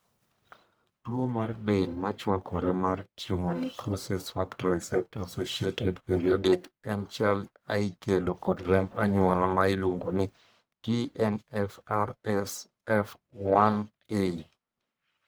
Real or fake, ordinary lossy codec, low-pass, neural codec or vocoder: fake; none; none; codec, 44.1 kHz, 1.7 kbps, Pupu-Codec